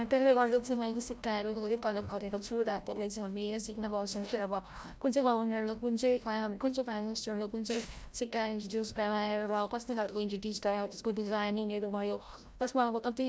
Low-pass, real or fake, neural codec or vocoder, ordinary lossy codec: none; fake; codec, 16 kHz, 0.5 kbps, FreqCodec, larger model; none